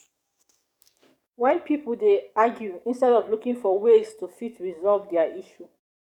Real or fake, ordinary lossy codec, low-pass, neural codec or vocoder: fake; none; 19.8 kHz; codec, 44.1 kHz, 7.8 kbps, DAC